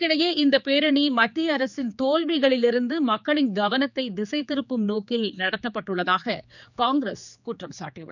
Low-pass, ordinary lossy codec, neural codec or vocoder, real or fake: 7.2 kHz; none; codec, 16 kHz, 4 kbps, X-Codec, HuBERT features, trained on general audio; fake